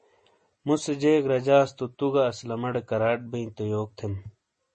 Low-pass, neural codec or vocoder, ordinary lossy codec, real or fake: 10.8 kHz; none; MP3, 32 kbps; real